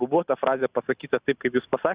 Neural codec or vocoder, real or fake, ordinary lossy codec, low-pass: none; real; Opus, 64 kbps; 3.6 kHz